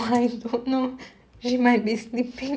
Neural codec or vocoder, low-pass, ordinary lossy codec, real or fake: none; none; none; real